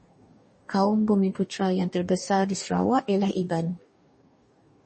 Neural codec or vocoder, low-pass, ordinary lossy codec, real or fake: codec, 44.1 kHz, 2.6 kbps, DAC; 10.8 kHz; MP3, 32 kbps; fake